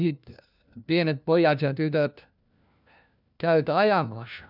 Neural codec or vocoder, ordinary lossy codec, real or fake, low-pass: codec, 16 kHz, 1 kbps, FunCodec, trained on LibriTTS, 50 frames a second; none; fake; 5.4 kHz